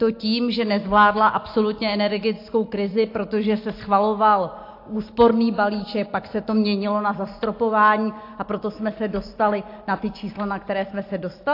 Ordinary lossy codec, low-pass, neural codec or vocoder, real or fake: AAC, 32 kbps; 5.4 kHz; none; real